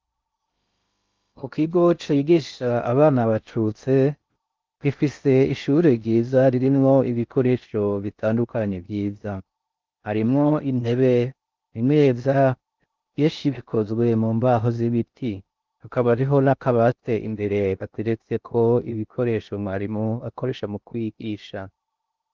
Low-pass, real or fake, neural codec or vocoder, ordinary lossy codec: 7.2 kHz; fake; codec, 16 kHz in and 24 kHz out, 0.6 kbps, FocalCodec, streaming, 2048 codes; Opus, 24 kbps